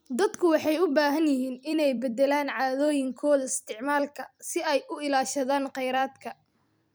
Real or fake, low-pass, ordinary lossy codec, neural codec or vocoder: fake; none; none; vocoder, 44.1 kHz, 128 mel bands every 256 samples, BigVGAN v2